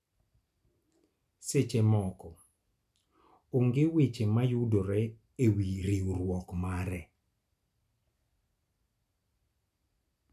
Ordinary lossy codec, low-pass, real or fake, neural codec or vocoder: none; 14.4 kHz; real; none